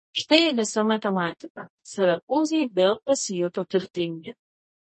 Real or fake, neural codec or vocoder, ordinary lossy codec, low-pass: fake; codec, 24 kHz, 0.9 kbps, WavTokenizer, medium music audio release; MP3, 32 kbps; 10.8 kHz